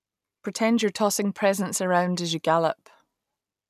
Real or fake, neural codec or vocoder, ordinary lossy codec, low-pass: real; none; none; 14.4 kHz